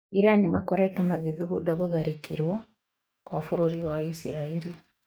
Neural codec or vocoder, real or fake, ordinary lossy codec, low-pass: codec, 44.1 kHz, 2.6 kbps, DAC; fake; none; none